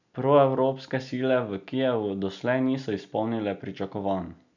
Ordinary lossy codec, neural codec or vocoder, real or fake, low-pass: none; none; real; 7.2 kHz